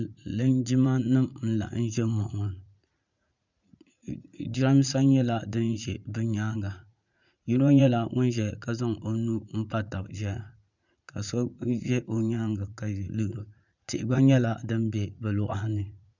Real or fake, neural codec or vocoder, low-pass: fake; vocoder, 44.1 kHz, 80 mel bands, Vocos; 7.2 kHz